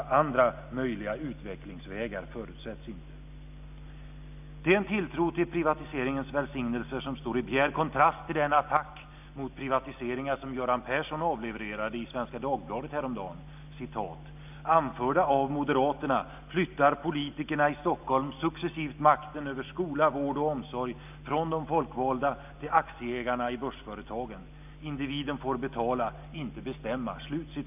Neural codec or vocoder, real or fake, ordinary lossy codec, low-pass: none; real; none; 3.6 kHz